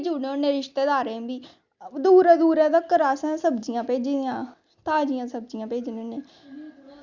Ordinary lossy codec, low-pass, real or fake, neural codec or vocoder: Opus, 64 kbps; 7.2 kHz; real; none